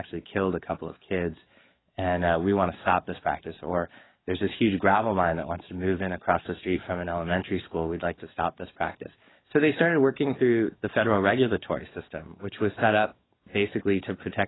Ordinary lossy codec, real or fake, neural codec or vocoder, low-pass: AAC, 16 kbps; fake; codec, 44.1 kHz, 7.8 kbps, DAC; 7.2 kHz